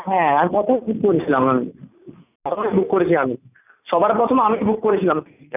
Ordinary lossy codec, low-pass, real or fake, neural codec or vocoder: none; 3.6 kHz; real; none